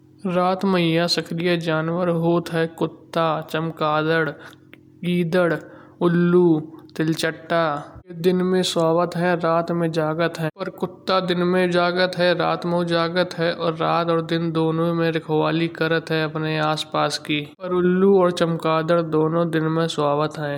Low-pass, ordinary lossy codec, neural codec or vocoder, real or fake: 19.8 kHz; MP3, 96 kbps; none; real